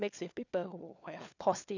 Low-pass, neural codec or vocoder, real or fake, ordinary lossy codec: 7.2 kHz; codec, 16 kHz, 4 kbps, FunCodec, trained on LibriTTS, 50 frames a second; fake; none